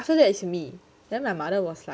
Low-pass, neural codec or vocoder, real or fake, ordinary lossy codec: none; none; real; none